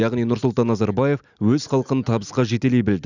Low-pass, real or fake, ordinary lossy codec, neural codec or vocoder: 7.2 kHz; real; none; none